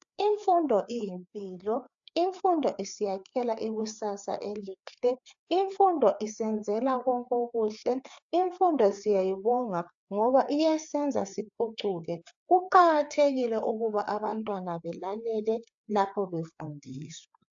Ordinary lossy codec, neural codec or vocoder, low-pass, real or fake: AAC, 64 kbps; codec, 16 kHz, 4 kbps, FreqCodec, larger model; 7.2 kHz; fake